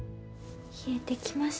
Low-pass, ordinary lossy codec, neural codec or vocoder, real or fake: none; none; none; real